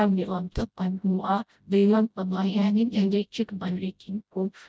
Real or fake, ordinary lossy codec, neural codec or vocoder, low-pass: fake; none; codec, 16 kHz, 0.5 kbps, FreqCodec, smaller model; none